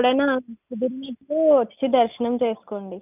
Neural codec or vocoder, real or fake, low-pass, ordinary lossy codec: none; real; 3.6 kHz; none